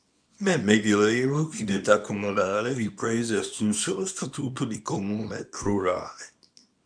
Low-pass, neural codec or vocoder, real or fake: 9.9 kHz; codec, 24 kHz, 0.9 kbps, WavTokenizer, small release; fake